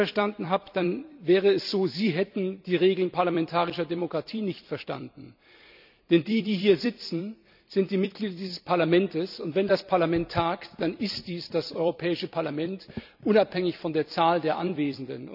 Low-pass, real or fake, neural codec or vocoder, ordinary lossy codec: 5.4 kHz; fake; vocoder, 44.1 kHz, 128 mel bands every 256 samples, BigVGAN v2; none